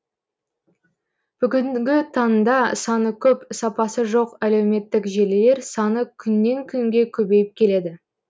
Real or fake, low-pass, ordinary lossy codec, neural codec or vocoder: real; none; none; none